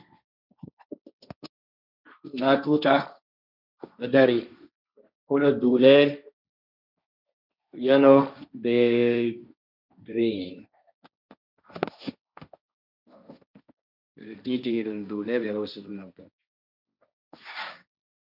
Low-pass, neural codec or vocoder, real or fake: 5.4 kHz; codec, 16 kHz, 1.1 kbps, Voila-Tokenizer; fake